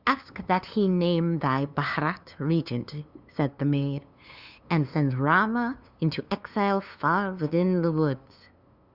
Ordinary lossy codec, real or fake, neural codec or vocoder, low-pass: Opus, 64 kbps; fake; codec, 16 kHz, 2 kbps, FunCodec, trained on LibriTTS, 25 frames a second; 5.4 kHz